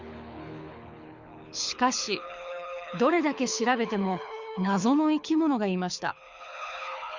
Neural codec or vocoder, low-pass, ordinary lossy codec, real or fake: codec, 24 kHz, 6 kbps, HILCodec; 7.2 kHz; Opus, 64 kbps; fake